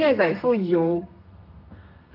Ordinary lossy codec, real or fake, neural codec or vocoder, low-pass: Opus, 24 kbps; fake; codec, 32 kHz, 1.9 kbps, SNAC; 5.4 kHz